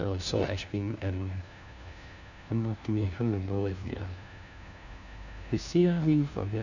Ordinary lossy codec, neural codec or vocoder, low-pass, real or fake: none; codec, 16 kHz, 1 kbps, FunCodec, trained on LibriTTS, 50 frames a second; 7.2 kHz; fake